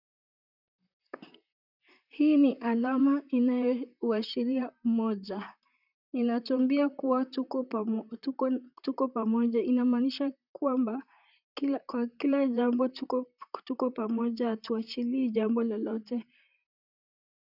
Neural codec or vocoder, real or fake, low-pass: vocoder, 44.1 kHz, 128 mel bands, Pupu-Vocoder; fake; 5.4 kHz